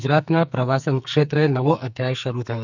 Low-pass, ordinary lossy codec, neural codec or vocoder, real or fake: 7.2 kHz; none; codec, 44.1 kHz, 2.6 kbps, SNAC; fake